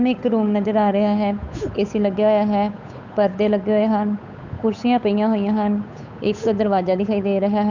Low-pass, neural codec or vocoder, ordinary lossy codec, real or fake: 7.2 kHz; codec, 16 kHz, 8 kbps, FunCodec, trained on Chinese and English, 25 frames a second; none; fake